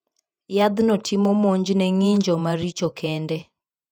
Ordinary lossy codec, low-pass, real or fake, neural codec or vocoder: none; 19.8 kHz; fake; vocoder, 48 kHz, 128 mel bands, Vocos